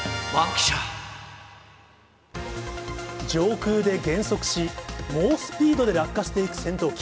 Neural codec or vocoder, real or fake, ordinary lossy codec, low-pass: none; real; none; none